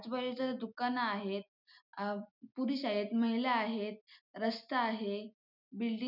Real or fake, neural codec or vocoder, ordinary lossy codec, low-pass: real; none; none; 5.4 kHz